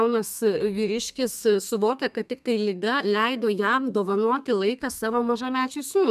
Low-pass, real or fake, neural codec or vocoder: 14.4 kHz; fake; codec, 32 kHz, 1.9 kbps, SNAC